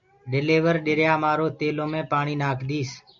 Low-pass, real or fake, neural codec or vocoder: 7.2 kHz; real; none